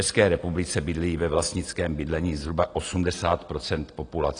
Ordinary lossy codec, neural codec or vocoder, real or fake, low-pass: AAC, 32 kbps; none; real; 9.9 kHz